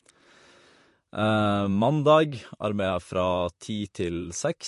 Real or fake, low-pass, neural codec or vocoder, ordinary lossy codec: fake; 14.4 kHz; vocoder, 44.1 kHz, 128 mel bands, Pupu-Vocoder; MP3, 48 kbps